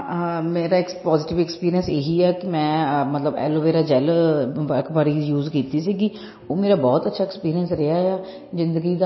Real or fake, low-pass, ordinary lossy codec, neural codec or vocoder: real; 7.2 kHz; MP3, 24 kbps; none